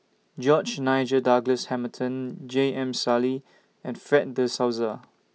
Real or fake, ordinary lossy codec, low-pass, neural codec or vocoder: real; none; none; none